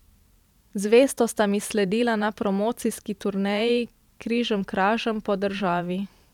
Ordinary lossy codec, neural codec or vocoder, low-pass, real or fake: none; vocoder, 44.1 kHz, 128 mel bands every 512 samples, BigVGAN v2; 19.8 kHz; fake